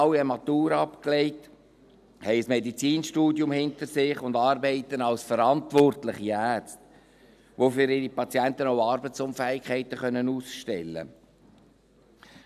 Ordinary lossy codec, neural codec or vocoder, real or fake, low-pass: none; none; real; 14.4 kHz